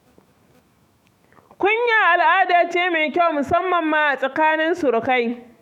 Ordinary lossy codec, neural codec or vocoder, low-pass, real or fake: none; autoencoder, 48 kHz, 128 numbers a frame, DAC-VAE, trained on Japanese speech; 19.8 kHz; fake